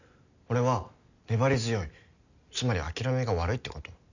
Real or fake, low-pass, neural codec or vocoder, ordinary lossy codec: real; 7.2 kHz; none; none